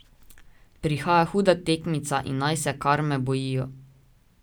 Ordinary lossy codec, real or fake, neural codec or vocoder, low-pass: none; real; none; none